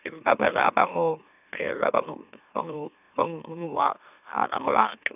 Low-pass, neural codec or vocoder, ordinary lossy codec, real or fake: 3.6 kHz; autoencoder, 44.1 kHz, a latent of 192 numbers a frame, MeloTTS; none; fake